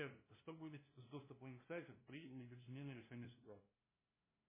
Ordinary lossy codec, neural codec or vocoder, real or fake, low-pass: MP3, 16 kbps; codec, 16 kHz, 0.5 kbps, FunCodec, trained on LibriTTS, 25 frames a second; fake; 3.6 kHz